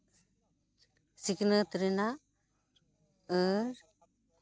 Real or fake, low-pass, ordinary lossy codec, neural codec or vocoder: real; none; none; none